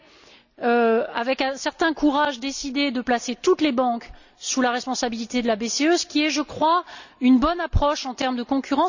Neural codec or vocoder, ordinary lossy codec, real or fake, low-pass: none; none; real; 7.2 kHz